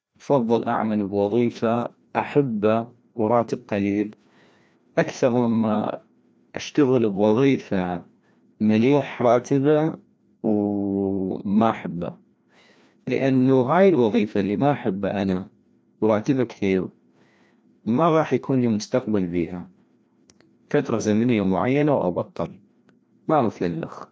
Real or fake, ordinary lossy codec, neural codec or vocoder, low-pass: fake; none; codec, 16 kHz, 1 kbps, FreqCodec, larger model; none